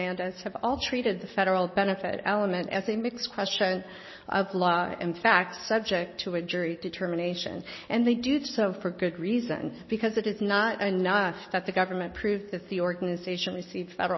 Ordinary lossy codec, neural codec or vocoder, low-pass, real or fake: MP3, 24 kbps; none; 7.2 kHz; real